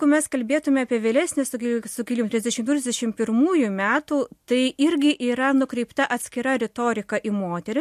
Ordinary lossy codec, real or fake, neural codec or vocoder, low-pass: MP3, 64 kbps; real; none; 14.4 kHz